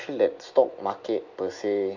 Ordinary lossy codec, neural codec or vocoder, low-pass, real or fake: MP3, 64 kbps; none; 7.2 kHz; real